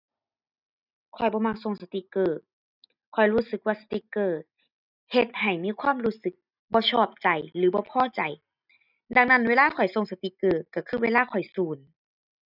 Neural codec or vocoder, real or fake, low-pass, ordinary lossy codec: none; real; 5.4 kHz; none